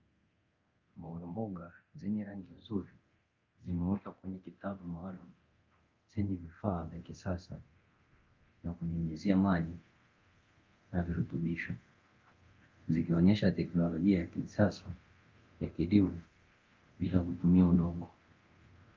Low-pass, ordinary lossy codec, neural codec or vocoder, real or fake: 7.2 kHz; Opus, 32 kbps; codec, 24 kHz, 0.9 kbps, DualCodec; fake